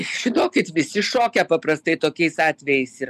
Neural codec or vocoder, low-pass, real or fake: none; 14.4 kHz; real